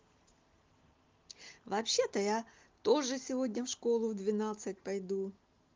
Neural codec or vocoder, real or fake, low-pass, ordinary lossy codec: none; real; 7.2 kHz; Opus, 32 kbps